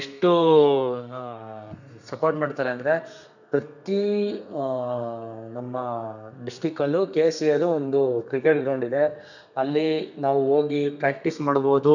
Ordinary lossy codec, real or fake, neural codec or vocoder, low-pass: none; fake; codec, 32 kHz, 1.9 kbps, SNAC; 7.2 kHz